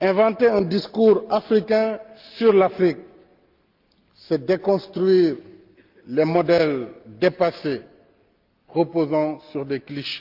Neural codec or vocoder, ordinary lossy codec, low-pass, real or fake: none; Opus, 32 kbps; 5.4 kHz; real